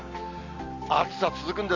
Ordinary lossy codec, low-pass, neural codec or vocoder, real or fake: none; 7.2 kHz; none; real